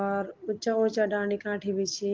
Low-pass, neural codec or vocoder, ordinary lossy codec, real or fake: 7.2 kHz; none; Opus, 16 kbps; real